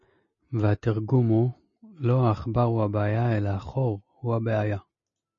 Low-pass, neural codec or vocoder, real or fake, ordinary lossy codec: 7.2 kHz; none; real; MP3, 32 kbps